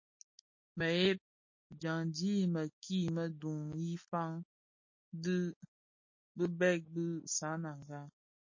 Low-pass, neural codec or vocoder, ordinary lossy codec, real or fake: 7.2 kHz; none; MP3, 32 kbps; real